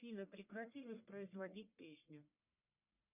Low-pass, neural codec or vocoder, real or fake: 3.6 kHz; codec, 44.1 kHz, 1.7 kbps, Pupu-Codec; fake